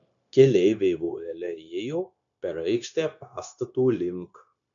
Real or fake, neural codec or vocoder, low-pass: fake; codec, 16 kHz, 0.9 kbps, LongCat-Audio-Codec; 7.2 kHz